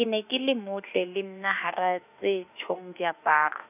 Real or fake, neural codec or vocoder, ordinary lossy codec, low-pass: fake; autoencoder, 48 kHz, 32 numbers a frame, DAC-VAE, trained on Japanese speech; none; 3.6 kHz